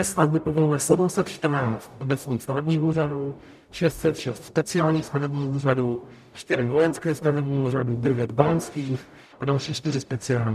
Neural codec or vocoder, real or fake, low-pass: codec, 44.1 kHz, 0.9 kbps, DAC; fake; 14.4 kHz